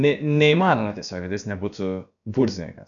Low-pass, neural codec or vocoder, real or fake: 7.2 kHz; codec, 16 kHz, about 1 kbps, DyCAST, with the encoder's durations; fake